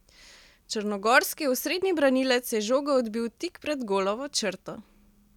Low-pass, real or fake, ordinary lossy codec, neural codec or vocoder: 19.8 kHz; real; none; none